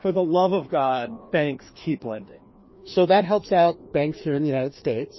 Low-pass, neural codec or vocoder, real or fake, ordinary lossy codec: 7.2 kHz; codec, 16 kHz, 2 kbps, FreqCodec, larger model; fake; MP3, 24 kbps